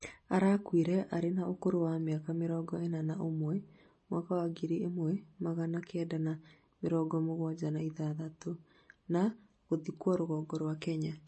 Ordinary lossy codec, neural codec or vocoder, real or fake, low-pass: MP3, 32 kbps; none; real; 10.8 kHz